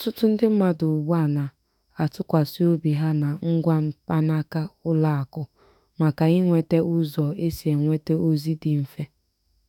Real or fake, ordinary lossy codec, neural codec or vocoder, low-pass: fake; none; autoencoder, 48 kHz, 32 numbers a frame, DAC-VAE, trained on Japanese speech; 19.8 kHz